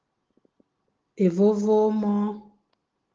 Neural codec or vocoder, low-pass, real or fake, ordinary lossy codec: none; 7.2 kHz; real; Opus, 16 kbps